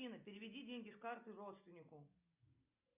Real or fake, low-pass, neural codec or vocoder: real; 3.6 kHz; none